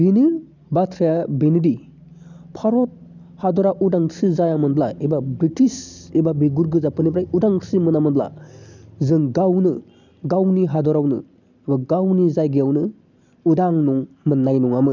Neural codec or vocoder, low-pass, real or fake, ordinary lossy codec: none; 7.2 kHz; real; none